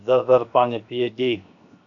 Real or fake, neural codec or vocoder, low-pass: fake; codec, 16 kHz, 0.7 kbps, FocalCodec; 7.2 kHz